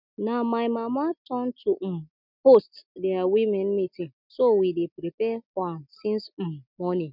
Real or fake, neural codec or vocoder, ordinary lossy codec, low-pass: real; none; none; 5.4 kHz